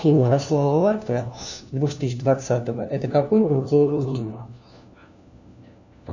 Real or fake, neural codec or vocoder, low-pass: fake; codec, 16 kHz, 1 kbps, FunCodec, trained on LibriTTS, 50 frames a second; 7.2 kHz